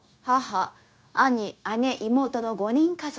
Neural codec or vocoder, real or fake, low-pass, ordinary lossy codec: codec, 16 kHz, 0.9 kbps, LongCat-Audio-Codec; fake; none; none